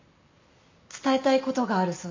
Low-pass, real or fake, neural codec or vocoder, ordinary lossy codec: 7.2 kHz; real; none; MP3, 48 kbps